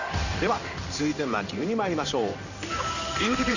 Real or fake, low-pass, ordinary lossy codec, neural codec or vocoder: fake; 7.2 kHz; none; codec, 16 kHz in and 24 kHz out, 1 kbps, XY-Tokenizer